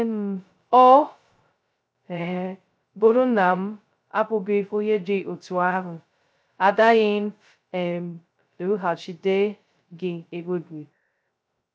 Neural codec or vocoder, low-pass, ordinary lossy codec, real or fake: codec, 16 kHz, 0.2 kbps, FocalCodec; none; none; fake